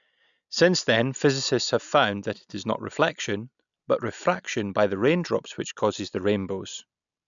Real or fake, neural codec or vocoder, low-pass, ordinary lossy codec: real; none; 7.2 kHz; none